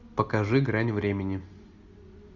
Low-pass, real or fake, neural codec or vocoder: 7.2 kHz; real; none